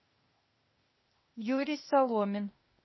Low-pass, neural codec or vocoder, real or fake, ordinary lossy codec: 7.2 kHz; codec, 16 kHz, 0.8 kbps, ZipCodec; fake; MP3, 24 kbps